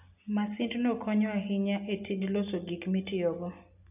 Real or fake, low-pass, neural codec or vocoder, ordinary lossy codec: real; 3.6 kHz; none; none